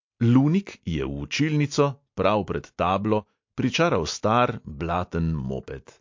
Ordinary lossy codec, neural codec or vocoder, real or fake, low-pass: MP3, 48 kbps; none; real; 7.2 kHz